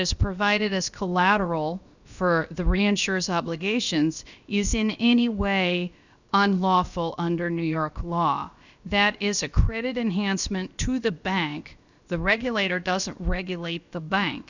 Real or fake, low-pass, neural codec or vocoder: fake; 7.2 kHz; codec, 16 kHz, about 1 kbps, DyCAST, with the encoder's durations